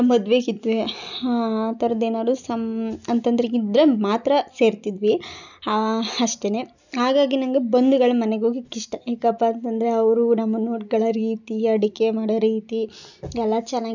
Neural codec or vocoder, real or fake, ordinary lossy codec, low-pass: none; real; none; 7.2 kHz